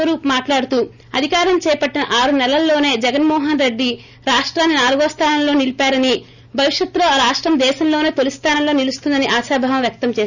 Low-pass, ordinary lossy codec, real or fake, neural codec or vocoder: 7.2 kHz; none; real; none